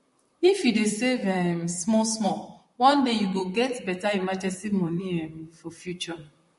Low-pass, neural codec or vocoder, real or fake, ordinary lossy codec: 14.4 kHz; vocoder, 44.1 kHz, 128 mel bands, Pupu-Vocoder; fake; MP3, 48 kbps